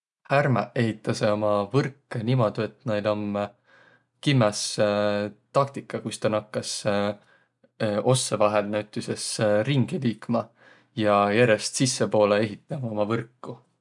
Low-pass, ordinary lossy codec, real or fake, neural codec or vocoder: 10.8 kHz; none; real; none